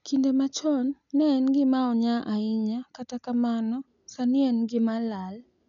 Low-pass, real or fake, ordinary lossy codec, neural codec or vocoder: 7.2 kHz; real; none; none